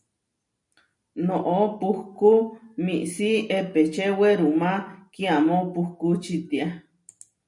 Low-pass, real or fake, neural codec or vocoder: 10.8 kHz; real; none